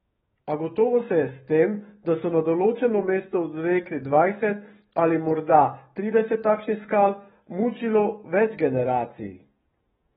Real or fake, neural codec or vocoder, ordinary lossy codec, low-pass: fake; codec, 44.1 kHz, 7.8 kbps, DAC; AAC, 16 kbps; 19.8 kHz